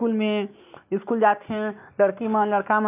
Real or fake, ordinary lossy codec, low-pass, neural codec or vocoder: real; none; 3.6 kHz; none